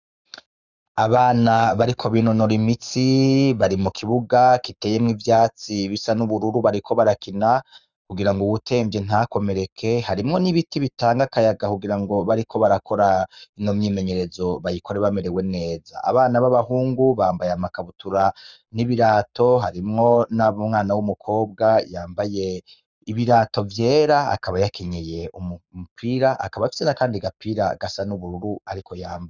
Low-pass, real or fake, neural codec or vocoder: 7.2 kHz; fake; codec, 44.1 kHz, 7.8 kbps, Pupu-Codec